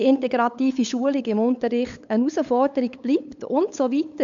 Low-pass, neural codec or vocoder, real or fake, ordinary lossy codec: 7.2 kHz; codec, 16 kHz, 8 kbps, FunCodec, trained on LibriTTS, 25 frames a second; fake; AAC, 64 kbps